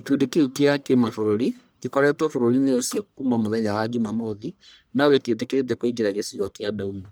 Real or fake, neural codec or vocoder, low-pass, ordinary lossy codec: fake; codec, 44.1 kHz, 1.7 kbps, Pupu-Codec; none; none